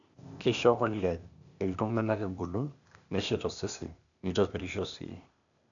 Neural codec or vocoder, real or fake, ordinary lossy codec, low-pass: codec, 16 kHz, 0.8 kbps, ZipCodec; fake; none; 7.2 kHz